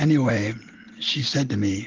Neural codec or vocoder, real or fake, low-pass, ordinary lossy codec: none; real; 7.2 kHz; Opus, 16 kbps